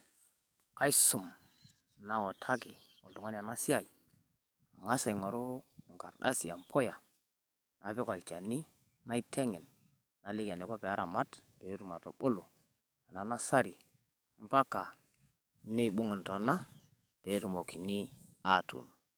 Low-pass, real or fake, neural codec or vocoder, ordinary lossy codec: none; fake; codec, 44.1 kHz, 7.8 kbps, DAC; none